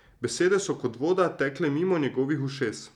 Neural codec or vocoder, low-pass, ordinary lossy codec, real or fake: none; 19.8 kHz; none; real